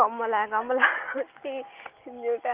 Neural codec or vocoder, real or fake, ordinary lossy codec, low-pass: none; real; Opus, 32 kbps; 3.6 kHz